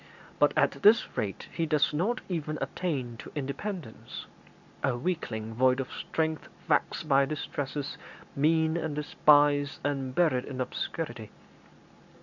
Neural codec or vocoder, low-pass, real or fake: none; 7.2 kHz; real